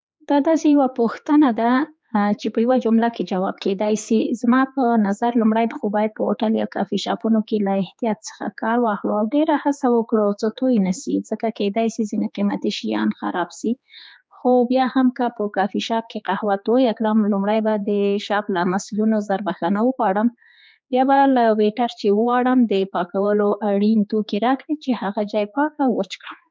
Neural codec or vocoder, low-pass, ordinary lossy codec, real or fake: codec, 16 kHz, 4 kbps, X-Codec, HuBERT features, trained on general audio; none; none; fake